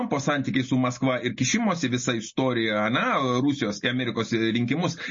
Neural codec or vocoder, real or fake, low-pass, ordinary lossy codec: none; real; 7.2 kHz; MP3, 32 kbps